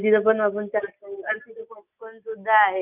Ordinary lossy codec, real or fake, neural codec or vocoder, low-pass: none; real; none; 3.6 kHz